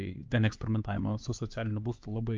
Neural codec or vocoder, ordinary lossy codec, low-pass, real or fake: codec, 16 kHz, 4 kbps, X-Codec, HuBERT features, trained on LibriSpeech; Opus, 32 kbps; 7.2 kHz; fake